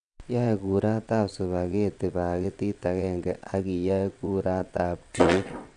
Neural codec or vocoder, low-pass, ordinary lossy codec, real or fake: vocoder, 22.05 kHz, 80 mel bands, WaveNeXt; 9.9 kHz; none; fake